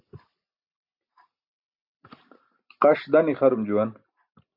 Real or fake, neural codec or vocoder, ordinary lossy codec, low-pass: real; none; AAC, 48 kbps; 5.4 kHz